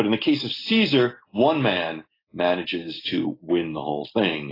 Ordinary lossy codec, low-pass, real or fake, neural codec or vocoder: AAC, 24 kbps; 5.4 kHz; real; none